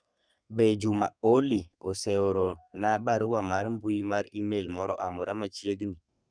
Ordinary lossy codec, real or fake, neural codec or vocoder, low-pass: none; fake; codec, 32 kHz, 1.9 kbps, SNAC; 9.9 kHz